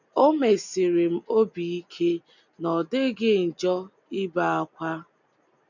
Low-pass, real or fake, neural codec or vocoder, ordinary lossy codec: 7.2 kHz; real; none; none